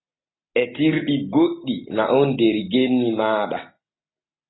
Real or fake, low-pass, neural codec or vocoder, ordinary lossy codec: real; 7.2 kHz; none; AAC, 16 kbps